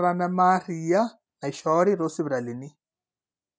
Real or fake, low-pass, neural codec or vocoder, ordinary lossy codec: real; none; none; none